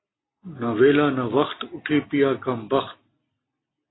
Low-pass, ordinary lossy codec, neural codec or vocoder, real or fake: 7.2 kHz; AAC, 16 kbps; none; real